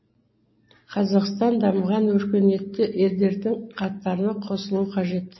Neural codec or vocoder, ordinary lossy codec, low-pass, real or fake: none; MP3, 24 kbps; 7.2 kHz; real